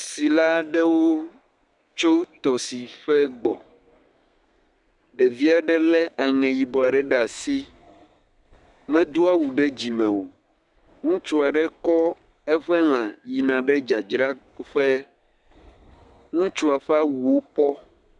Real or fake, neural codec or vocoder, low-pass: fake; codec, 32 kHz, 1.9 kbps, SNAC; 10.8 kHz